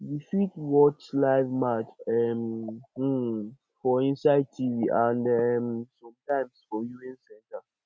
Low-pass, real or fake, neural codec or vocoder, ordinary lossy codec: none; real; none; none